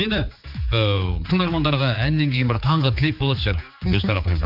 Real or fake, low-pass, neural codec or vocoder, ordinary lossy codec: fake; 5.4 kHz; codec, 16 kHz, 4 kbps, X-Codec, HuBERT features, trained on general audio; none